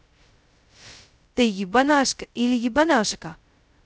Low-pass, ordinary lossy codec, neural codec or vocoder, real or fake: none; none; codec, 16 kHz, 0.2 kbps, FocalCodec; fake